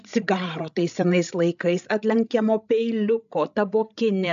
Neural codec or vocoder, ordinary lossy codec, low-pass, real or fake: codec, 16 kHz, 16 kbps, FreqCodec, larger model; AAC, 96 kbps; 7.2 kHz; fake